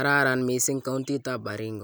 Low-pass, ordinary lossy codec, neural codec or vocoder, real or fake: none; none; none; real